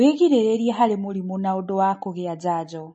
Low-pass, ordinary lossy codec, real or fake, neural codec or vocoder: 10.8 kHz; MP3, 32 kbps; real; none